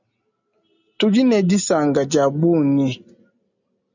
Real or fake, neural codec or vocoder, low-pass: real; none; 7.2 kHz